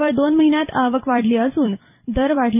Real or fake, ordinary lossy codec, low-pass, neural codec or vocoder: fake; none; 3.6 kHz; vocoder, 44.1 kHz, 128 mel bands every 256 samples, BigVGAN v2